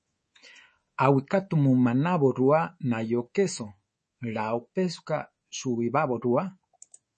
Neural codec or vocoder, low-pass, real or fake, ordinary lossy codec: codec, 24 kHz, 3.1 kbps, DualCodec; 10.8 kHz; fake; MP3, 32 kbps